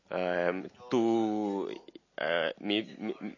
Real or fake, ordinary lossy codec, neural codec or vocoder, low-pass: real; MP3, 32 kbps; none; 7.2 kHz